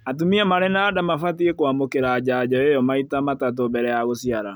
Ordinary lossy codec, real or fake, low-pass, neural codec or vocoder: none; real; none; none